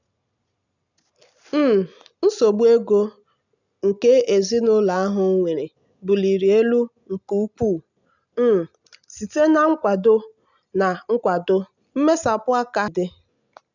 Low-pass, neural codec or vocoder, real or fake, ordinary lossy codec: 7.2 kHz; none; real; none